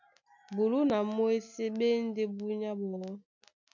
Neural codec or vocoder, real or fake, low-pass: none; real; 7.2 kHz